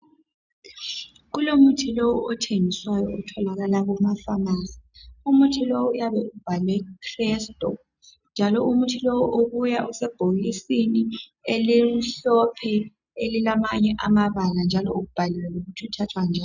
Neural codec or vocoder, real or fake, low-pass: none; real; 7.2 kHz